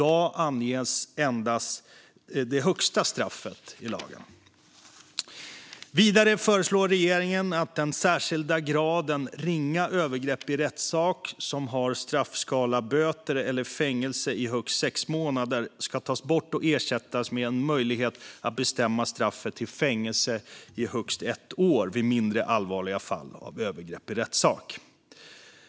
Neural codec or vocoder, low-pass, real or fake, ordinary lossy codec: none; none; real; none